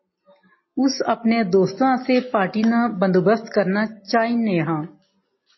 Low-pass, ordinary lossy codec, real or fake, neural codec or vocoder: 7.2 kHz; MP3, 24 kbps; real; none